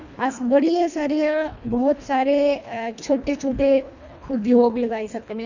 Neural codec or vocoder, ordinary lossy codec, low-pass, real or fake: codec, 24 kHz, 1.5 kbps, HILCodec; none; 7.2 kHz; fake